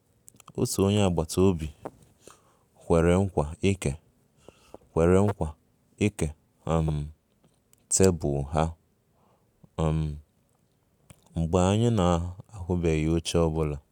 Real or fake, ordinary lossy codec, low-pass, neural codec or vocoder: real; none; 19.8 kHz; none